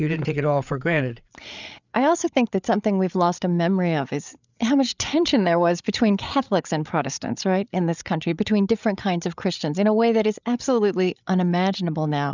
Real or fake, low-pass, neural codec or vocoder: fake; 7.2 kHz; codec, 16 kHz, 8 kbps, FreqCodec, larger model